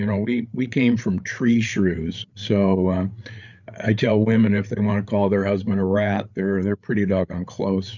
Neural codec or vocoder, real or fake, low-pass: codec, 16 kHz, 8 kbps, FreqCodec, larger model; fake; 7.2 kHz